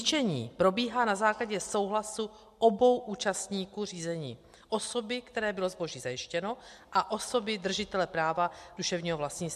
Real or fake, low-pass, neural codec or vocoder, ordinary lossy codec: real; 14.4 kHz; none; MP3, 64 kbps